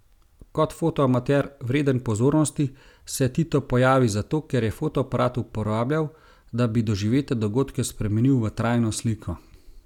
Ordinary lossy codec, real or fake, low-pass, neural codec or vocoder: none; real; 19.8 kHz; none